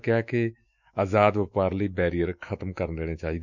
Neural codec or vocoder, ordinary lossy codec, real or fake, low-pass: autoencoder, 48 kHz, 128 numbers a frame, DAC-VAE, trained on Japanese speech; none; fake; 7.2 kHz